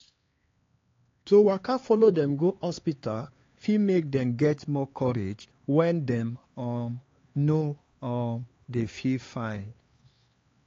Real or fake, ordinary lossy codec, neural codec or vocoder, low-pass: fake; AAC, 32 kbps; codec, 16 kHz, 4 kbps, X-Codec, HuBERT features, trained on LibriSpeech; 7.2 kHz